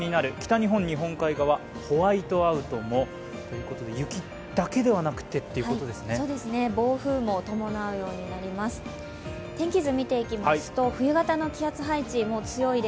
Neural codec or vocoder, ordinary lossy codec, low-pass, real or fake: none; none; none; real